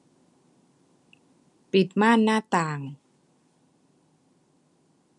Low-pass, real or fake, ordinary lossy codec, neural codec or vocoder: 10.8 kHz; real; none; none